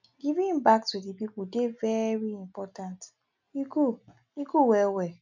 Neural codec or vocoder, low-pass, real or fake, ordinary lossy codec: none; 7.2 kHz; real; none